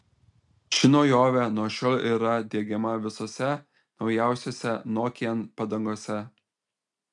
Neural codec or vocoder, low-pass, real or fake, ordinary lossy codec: none; 10.8 kHz; real; MP3, 96 kbps